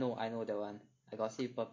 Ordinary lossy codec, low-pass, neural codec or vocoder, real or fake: MP3, 48 kbps; 7.2 kHz; none; real